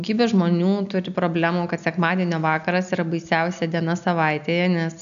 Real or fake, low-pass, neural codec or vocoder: real; 7.2 kHz; none